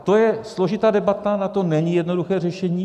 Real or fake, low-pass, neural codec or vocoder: real; 14.4 kHz; none